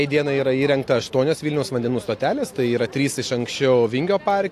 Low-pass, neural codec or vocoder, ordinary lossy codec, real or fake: 14.4 kHz; none; AAC, 64 kbps; real